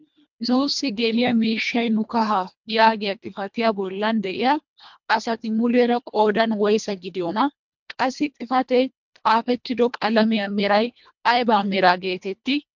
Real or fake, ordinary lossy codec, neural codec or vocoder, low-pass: fake; MP3, 64 kbps; codec, 24 kHz, 1.5 kbps, HILCodec; 7.2 kHz